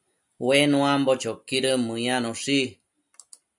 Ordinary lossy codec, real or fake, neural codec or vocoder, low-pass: MP3, 96 kbps; real; none; 10.8 kHz